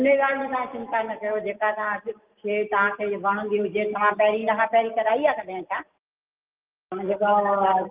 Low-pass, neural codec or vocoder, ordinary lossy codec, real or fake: 3.6 kHz; none; Opus, 32 kbps; real